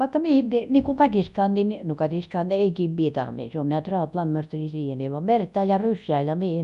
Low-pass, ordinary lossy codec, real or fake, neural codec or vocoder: 10.8 kHz; none; fake; codec, 24 kHz, 0.9 kbps, WavTokenizer, large speech release